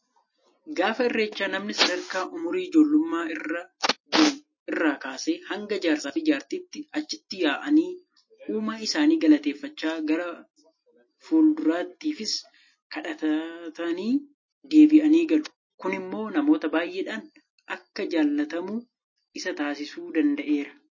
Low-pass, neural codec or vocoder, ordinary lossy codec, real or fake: 7.2 kHz; none; MP3, 32 kbps; real